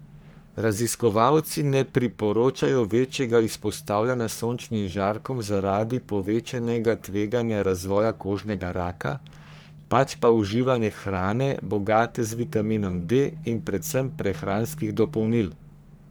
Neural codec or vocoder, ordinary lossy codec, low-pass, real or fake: codec, 44.1 kHz, 3.4 kbps, Pupu-Codec; none; none; fake